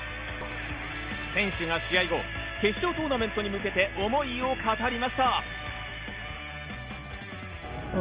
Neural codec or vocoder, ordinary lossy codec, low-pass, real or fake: none; Opus, 24 kbps; 3.6 kHz; real